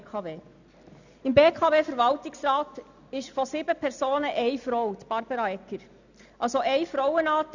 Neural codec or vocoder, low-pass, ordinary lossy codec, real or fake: none; 7.2 kHz; none; real